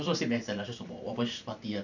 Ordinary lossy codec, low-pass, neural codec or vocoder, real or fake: none; 7.2 kHz; vocoder, 44.1 kHz, 128 mel bands every 512 samples, BigVGAN v2; fake